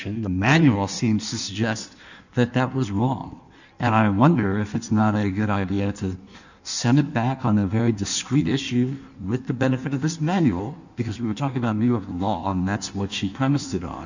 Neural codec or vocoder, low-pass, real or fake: codec, 16 kHz in and 24 kHz out, 1.1 kbps, FireRedTTS-2 codec; 7.2 kHz; fake